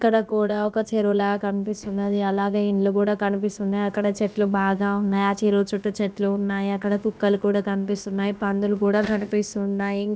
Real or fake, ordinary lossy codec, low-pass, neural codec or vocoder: fake; none; none; codec, 16 kHz, about 1 kbps, DyCAST, with the encoder's durations